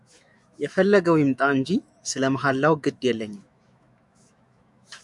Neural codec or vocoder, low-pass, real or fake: autoencoder, 48 kHz, 128 numbers a frame, DAC-VAE, trained on Japanese speech; 10.8 kHz; fake